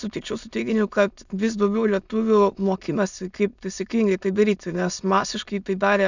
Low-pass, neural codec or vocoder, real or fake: 7.2 kHz; autoencoder, 22.05 kHz, a latent of 192 numbers a frame, VITS, trained on many speakers; fake